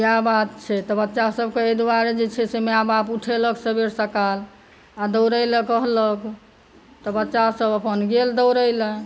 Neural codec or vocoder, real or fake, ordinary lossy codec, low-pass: none; real; none; none